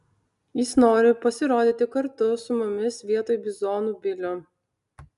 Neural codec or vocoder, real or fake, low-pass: none; real; 10.8 kHz